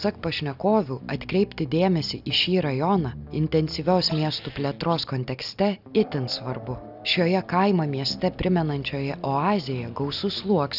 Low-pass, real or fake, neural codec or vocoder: 5.4 kHz; real; none